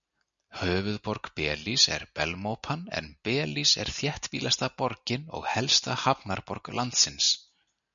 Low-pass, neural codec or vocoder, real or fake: 7.2 kHz; none; real